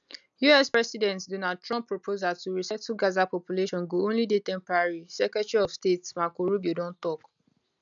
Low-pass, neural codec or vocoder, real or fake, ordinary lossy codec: 7.2 kHz; none; real; none